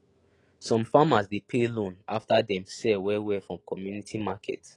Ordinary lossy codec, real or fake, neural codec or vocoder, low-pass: AAC, 32 kbps; fake; autoencoder, 48 kHz, 128 numbers a frame, DAC-VAE, trained on Japanese speech; 10.8 kHz